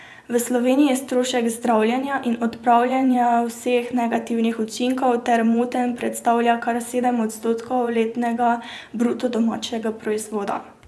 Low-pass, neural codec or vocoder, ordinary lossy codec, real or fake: none; vocoder, 24 kHz, 100 mel bands, Vocos; none; fake